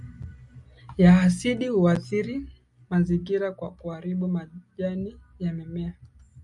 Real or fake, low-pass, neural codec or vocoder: real; 10.8 kHz; none